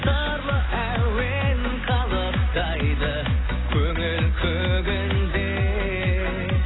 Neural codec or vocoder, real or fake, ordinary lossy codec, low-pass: none; real; AAC, 16 kbps; 7.2 kHz